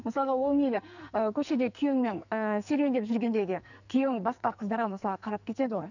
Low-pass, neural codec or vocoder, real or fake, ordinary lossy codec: 7.2 kHz; codec, 44.1 kHz, 2.6 kbps, SNAC; fake; none